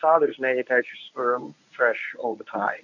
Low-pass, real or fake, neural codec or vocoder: 7.2 kHz; fake; codec, 24 kHz, 0.9 kbps, WavTokenizer, medium speech release version 1